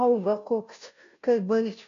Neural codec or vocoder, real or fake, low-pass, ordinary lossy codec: codec, 16 kHz, 0.5 kbps, FunCodec, trained on Chinese and English, 25 frames a second; fake; 7.2 kHz; AAC, 96 kbps